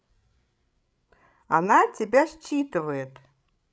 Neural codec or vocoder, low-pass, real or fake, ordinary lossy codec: codec, 16 kHz, 16 kbps, FreqCodec, larger model; none; fake; none